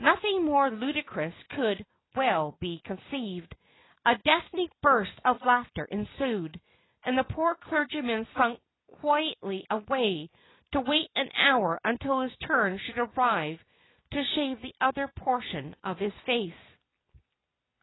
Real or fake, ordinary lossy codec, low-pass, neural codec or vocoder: real; AAC, 16 kbps; 7.2 kHz; none